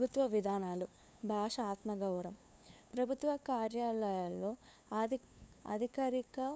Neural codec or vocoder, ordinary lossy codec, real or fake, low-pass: codec, 16 kHz, 2 kbps, FunCodec, trained on LibriTTS, 25 frames a second; none; fake; none